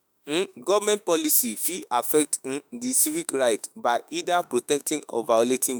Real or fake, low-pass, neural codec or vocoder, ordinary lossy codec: fake; none; autoencoder, 48 kHz, 32 numbers a frame, DAC-VAE, trained on Japanese speech; none